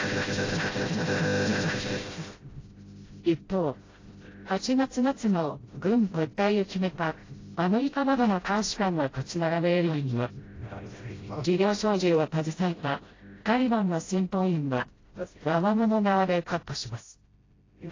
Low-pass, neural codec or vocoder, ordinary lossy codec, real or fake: 7.2 kHz; codec, 16 kHz, 0.5 kbps, FreqCodec, smaller model; AAC, 32 kbps; fake